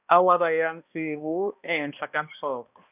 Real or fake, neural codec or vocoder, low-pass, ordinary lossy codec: fake; codec, 16 kHz, 1 kbps, X-Codec, HuBERT features, trained on balanced general audio; 3.6 kHz; none